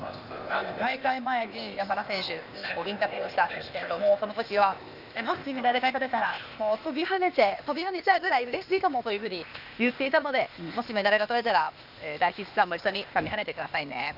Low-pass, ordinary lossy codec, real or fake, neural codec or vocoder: 5.4 kHz; none; fake; codec, 16 kHz, 0.8 kbps, ZipCodec